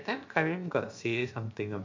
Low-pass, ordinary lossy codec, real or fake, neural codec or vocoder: 7.2 kHz; MP3, 48 kbps; fake; codec, 16 kHz, about 1 kbps, DyCAST, with the encoder's durations